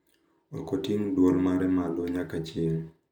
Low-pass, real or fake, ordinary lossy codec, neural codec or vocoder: 19.8 kHz; real; none; none